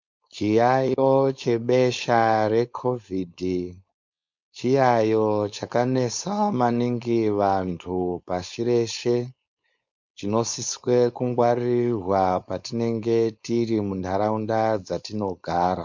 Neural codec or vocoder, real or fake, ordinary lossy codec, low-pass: codec, 16 kHz, 4.8 kbps, FACodec; fake; MP3, 64 kbps; 7.2 kHz